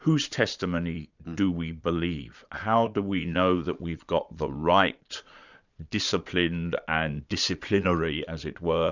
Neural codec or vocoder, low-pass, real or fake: vocoder, 22.05 kHz, 80 mel bands, Vocos; 7.2 kHz; fake